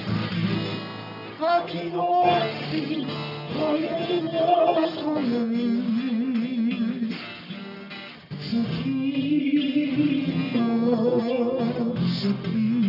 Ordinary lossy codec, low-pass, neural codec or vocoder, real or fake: none; 5.4 kHz; codec, 44.1 kHz, 1.7 kbps, Pupu-Codec; fake